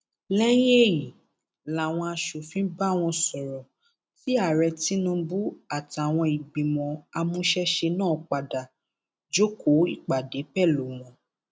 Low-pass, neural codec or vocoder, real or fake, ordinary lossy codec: none; none; real; none